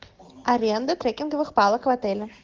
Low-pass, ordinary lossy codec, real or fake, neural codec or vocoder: 7.2 kHz; Opus, 16 kbps; real; none